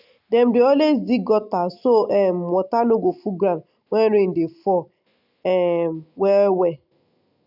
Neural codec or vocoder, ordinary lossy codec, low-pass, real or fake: none; none; 5.4 kHz; real